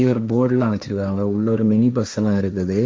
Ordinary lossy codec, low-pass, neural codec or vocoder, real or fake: none; none; codec, 16 kHz, 1.1 kbps, Voila-Tokenizer; fake